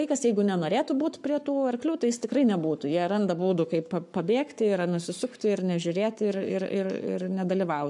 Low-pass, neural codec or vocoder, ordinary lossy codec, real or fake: 10.8 kHz; codec, 44.1 kHz, 7.8 kbps, Pupu-Codec; MP3, 96 kbps; fake